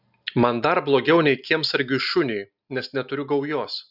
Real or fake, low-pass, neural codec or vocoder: real; 5.4 kHz; none